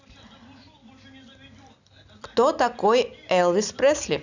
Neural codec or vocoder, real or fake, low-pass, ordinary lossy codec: none; real; 7.2 kHz; none